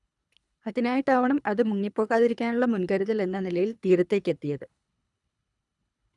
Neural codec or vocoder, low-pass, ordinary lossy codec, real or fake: codec, 24 kHz, 3 kbps, HILCodec; 10.8 kHz; none; fake